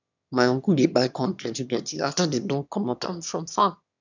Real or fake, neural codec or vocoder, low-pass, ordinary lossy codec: fake; autoencoder, 22.05 kHz, a latent of 192 numbers a frame, VITS, trained on one speaker; 7.2 kHz; none